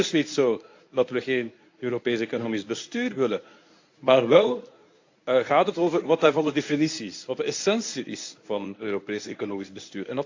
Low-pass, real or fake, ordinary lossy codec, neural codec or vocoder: 7.2 kHz; fake; AAC, 48 kbps; codec, 24 kHz, 0.9 kbps, WavTokenizer, medium speech release version 1